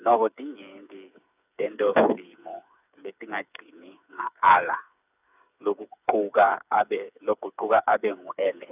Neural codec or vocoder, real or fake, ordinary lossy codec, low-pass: codec, 16 kHz, 4 kbps, FreqCodec, smaller model; fake; none; 3.6 kHz